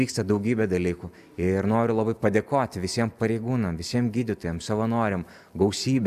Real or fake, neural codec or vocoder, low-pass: real; none; 14.4 kHz